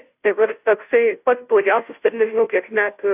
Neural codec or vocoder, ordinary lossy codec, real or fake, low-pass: codec, 16 kHz, 0.5 kbps, FunCodec, trained on Chinese and English, 25 frames a second; AAC, 32 kbps; fake; 3.6 kHz